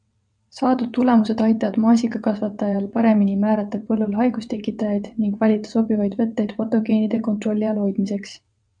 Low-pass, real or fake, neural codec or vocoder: 9.9 kHz; fake; vocoder, 22.05 kHz, 80 mel bands, WaveNeXt